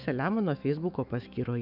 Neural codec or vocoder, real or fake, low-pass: autoencoder, 48 kHz, 128 numbers a frame, DAC-VAE, trained on Japanese speech; fake; 5.4 kHz